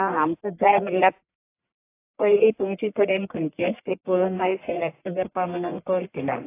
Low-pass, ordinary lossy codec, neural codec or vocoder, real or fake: 3.6 kHz; AAC, 24 kbps; codec, 44.1 kHz, 1.7 kbps, Pupu-Codec; fake